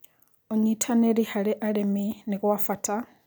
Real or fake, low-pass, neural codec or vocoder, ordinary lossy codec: real; none; none; none